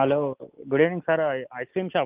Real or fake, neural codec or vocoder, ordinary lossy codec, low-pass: real; none; Opus, 32 kbps; 3.6 kHz